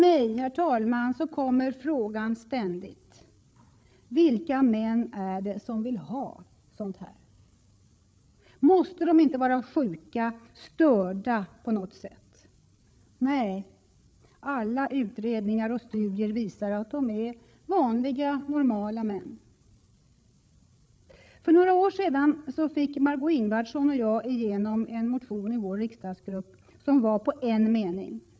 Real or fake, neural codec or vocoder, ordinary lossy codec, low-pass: fake; codec, 16 kHz, 8 kbps, FreqCodec, larger model; none; none